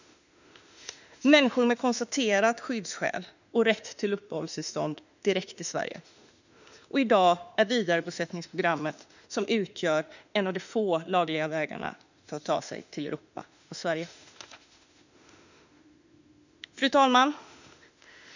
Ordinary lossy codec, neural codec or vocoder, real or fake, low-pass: none; autoencoder, 48 kHz, 32 numbers a frame, DAC-VAE, trained on Japanese speech; fake; 7.2 kHz